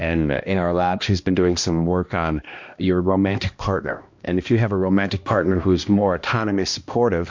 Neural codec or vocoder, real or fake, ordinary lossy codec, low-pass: codec, 16 kHz, 1 kbps, X-Codec, HuBERT features, trained on balanced general audio; fake; MP3, 48 kbps; 7.2 kHz